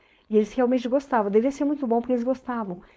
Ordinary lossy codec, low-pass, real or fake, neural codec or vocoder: none; none; fake; codec, 16 kHz, 4.8 kbps, FACodec